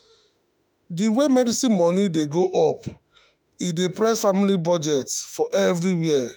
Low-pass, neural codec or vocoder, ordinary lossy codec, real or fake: none; autoencoder, 48 kHz, 32 numbers a frame, DAC-VAE, trained on Japanese speech; none; fake